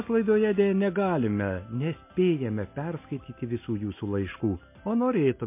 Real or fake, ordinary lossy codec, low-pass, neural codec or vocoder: real; MP3, 24 kbps; 3.6 kHz; none